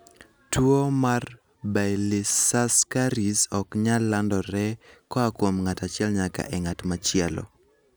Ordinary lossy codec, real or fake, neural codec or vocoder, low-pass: none; real; none; none